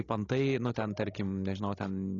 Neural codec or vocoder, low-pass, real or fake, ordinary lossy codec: codec, 16 kHz, 16 kbps, FreqCodec, larger model; 7.2 kHz; fake; AAC, 32 kbps